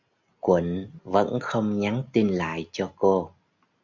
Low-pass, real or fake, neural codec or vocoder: 7.2 kHz; real; none